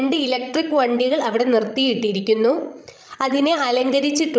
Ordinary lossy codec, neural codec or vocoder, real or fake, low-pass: none; codec, 16 kHz, 16 kbps, FreqCodec, larger model; fake; none